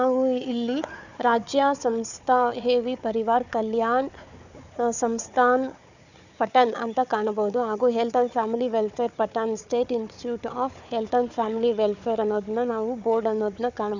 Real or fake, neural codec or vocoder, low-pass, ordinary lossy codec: fake; codec, 16 kHz, 4 kbps, FunCodec, trained on Chinese and English, 50 frames a second; 7.2 kHz; none